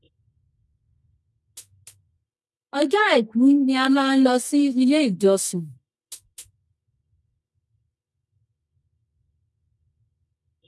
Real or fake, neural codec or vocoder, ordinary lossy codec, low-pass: fake; codec, 24 kHz, 0.9 kbps, WavTokenizer, medium music audio release; none; none